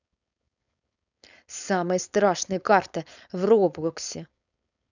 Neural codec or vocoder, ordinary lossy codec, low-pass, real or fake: codec, 16 kHz, 4.8 kbps, FACodec; none; 7.2 kHz; fake